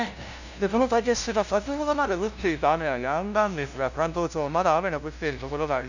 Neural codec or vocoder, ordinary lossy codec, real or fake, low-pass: codec, 16 kHz, 0.5 kbps, FunCodec, trained on LibriTTS, 25 frames a second; none; fake; 7.2 kHz